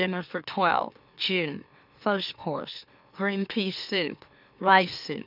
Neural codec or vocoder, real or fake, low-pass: autoencoder, 44.1 kHz, a latent of 192 numbers a frame, MeloTTS; fake; 5.4 kHz